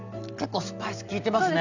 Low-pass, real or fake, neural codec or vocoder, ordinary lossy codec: 7.2 kHz; real; none; none